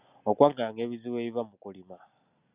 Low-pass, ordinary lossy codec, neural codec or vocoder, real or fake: 3.6 kHz; Opus, 64 kbps; none; real